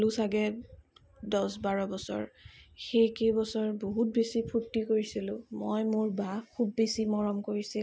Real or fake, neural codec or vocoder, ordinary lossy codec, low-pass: real; none; none; none